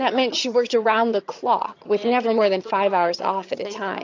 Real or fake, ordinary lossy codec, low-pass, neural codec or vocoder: fake; AAC, 48 kbps; 7.2 kHz; vocoder, 22.05 kHz, 80 mel bands, HiFi-GAN